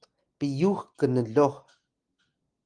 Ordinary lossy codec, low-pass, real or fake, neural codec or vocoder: Opus, 24 kbps; 9.9 kHz; real; none